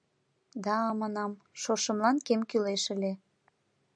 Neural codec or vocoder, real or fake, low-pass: none; real; 9.9 kHz